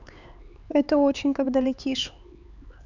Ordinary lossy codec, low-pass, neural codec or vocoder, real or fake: none; 7.2 kHz; codec, 16 kHz, 4 kbps, X-Codec, HuBERT features, trained on LibriSpeech; fake